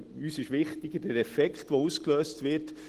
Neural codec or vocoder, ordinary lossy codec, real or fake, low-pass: none; Opus, 16 kbps; real; 14.4 kHz